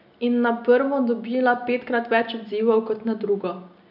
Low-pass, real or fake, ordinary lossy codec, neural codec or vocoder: 5.4 kHz; real; none; none